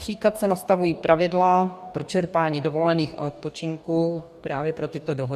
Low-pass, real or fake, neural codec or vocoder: 14.4 kHz; fake; codec, 44.1 kHz, 2.6 kbps, DAC